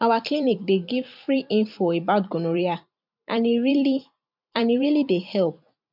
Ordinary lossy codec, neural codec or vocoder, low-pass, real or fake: MP3, 48 kbps; vocoder, 44.1 kHz, 128 mel bands every 256 samples, BigVGAN v2; 5.4 kHz; fake